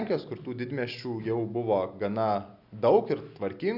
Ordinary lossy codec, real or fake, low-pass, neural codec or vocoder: Opus, 64 kbps; real; 5.4 kHz; none